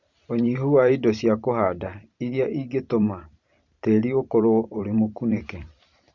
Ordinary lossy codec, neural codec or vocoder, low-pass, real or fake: Opus, 64 kbps; vocoder, 44.1 kHz, 128 mel bands every 512 samples, BigVGAN v2; 7.2 kHz; fake